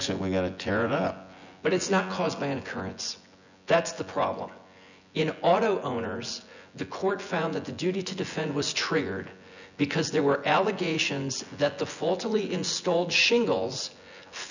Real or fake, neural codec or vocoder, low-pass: fake; vocoder, 24 kHz, 100 mel bands, Vocos; 7.2 kHz